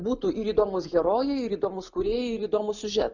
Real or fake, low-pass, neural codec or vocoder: real; 7.2 kHz; none